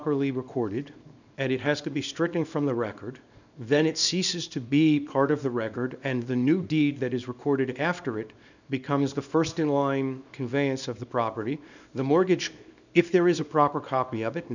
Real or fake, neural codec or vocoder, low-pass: fake; codec, 24 kHz, 0.9 kbps, WavTokenizer, small release; 7.2 kHz